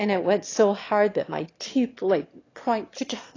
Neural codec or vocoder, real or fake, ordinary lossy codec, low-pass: autoencoder, 22.05 kHz, a latent of 192 numbers a frame, VITS, trained on one speaker; fake; AAC, 32 kbps; 7.2 kHz